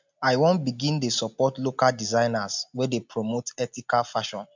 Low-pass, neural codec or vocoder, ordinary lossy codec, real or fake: 7.2 kHz; none; MP3, 64 kbps; real